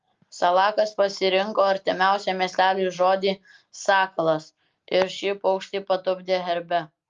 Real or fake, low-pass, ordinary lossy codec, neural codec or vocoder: real; 7.2 kHz; Opus, 32 kbps; none